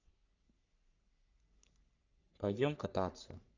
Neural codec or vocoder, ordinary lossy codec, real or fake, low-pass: codec, 44.1 kHz, 3.4 kbps, Pupu-Codec; none; fake; 7.2 kHz